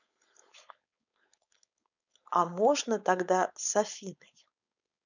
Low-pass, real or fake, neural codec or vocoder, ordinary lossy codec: 7.2 kHz; fake; codec, 16 kHz, 4.8 kbps, FACodec; none